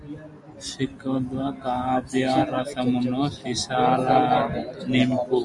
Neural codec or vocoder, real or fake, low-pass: none; real; 10.8 kHz